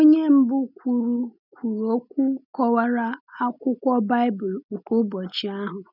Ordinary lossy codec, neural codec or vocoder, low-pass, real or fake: none; none; 5.4 kHz; real